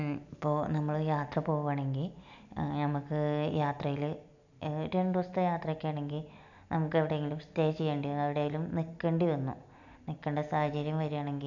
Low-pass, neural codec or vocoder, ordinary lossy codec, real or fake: 7.2 kHz; none; none; real